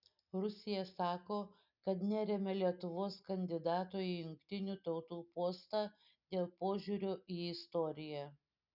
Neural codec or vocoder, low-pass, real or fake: none; 5.4 kHz; real